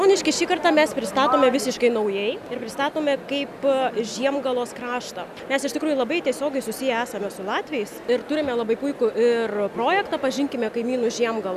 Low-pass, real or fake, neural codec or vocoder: 14.4 kHz; real; none